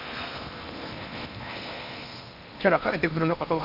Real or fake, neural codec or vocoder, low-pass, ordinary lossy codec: fake; codec, 16 kHz in and 24 kHz out, 0.8 kbps, FocalCodec, streaming, 65536 codes; 5.4 kHz; none